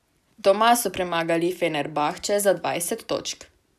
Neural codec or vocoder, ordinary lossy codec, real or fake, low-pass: none; none; real; 14.4 kHz